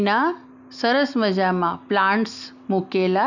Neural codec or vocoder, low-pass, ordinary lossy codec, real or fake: none; 7.2 kHz; none; real